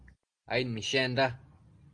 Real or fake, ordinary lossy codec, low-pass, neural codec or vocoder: real; Opus, 24 kbps; 9.9 kHz; none